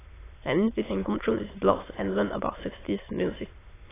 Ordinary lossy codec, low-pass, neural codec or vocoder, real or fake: AAC, 16 kbps; 3.6 kHz; autoencoder, 22.05 kHz, a latent of 192 numbers a frame, VITS, trained on many speakers; fake